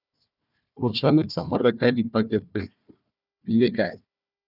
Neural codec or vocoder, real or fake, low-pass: codec, 16 kHz, 1 kbps, FunCodec, trained on Chinese and English, 50 frames a second; fake; 5.4 kHz